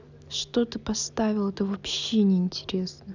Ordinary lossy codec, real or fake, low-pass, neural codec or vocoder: none; real; 7.2 kHz; none